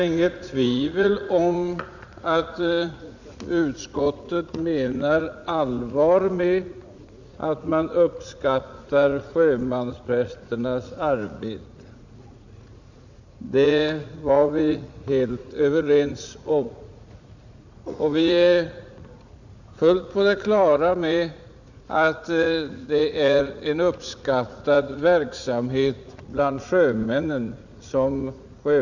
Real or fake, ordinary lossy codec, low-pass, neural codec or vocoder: fake; none; 7.2 kHz; vocoder, 44.1 kHz, 80 mel bands, Vocos